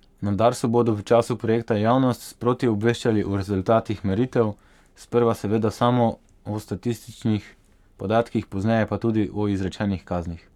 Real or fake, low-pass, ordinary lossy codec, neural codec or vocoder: fake; 19.8 kHz; none; codec, 44.1 kHz, 7.8 kbps, Pupu-Codec